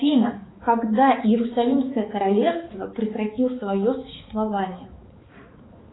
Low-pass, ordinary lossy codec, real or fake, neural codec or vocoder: 7.2 kHz; AAC, 16 kbps; fake; codec, 16 kHz, 4 kbps, X-Codec, HuBERT features, trained on balanced general audio